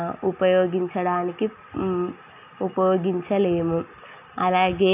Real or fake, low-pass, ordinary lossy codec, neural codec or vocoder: real; 3.6 kHz; none; none